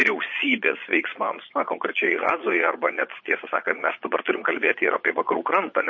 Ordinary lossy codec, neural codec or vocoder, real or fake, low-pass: MP3, 32 kbps; vocoder, 22.05 kHz, 80 mel bands, Vocos; fake; 7.2 kHz